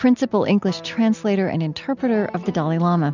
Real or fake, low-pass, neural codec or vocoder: real; 7.2 kHz; none